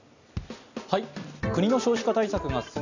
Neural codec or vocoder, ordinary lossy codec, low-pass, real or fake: none; none; 7.2 kHz; real